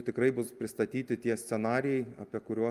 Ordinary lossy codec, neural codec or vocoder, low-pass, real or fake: Opus, 32 kbps; none; 14.4 kHz; real